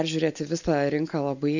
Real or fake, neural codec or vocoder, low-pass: real; none; 7.2 kHz